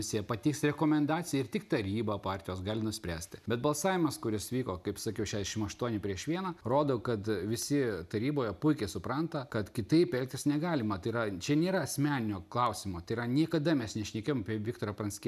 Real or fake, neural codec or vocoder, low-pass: real; none; 14.4 kHz